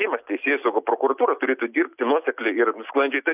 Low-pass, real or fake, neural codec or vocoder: 3.6 kHz; real; none